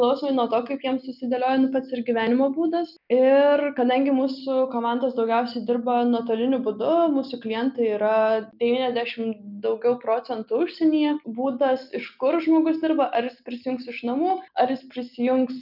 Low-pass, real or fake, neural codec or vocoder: 5.4 kHz; real; none